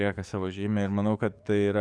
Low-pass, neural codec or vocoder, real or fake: 9.9 kHz; codec, 44.1 kHz, 7.8 kbps, DAC; fake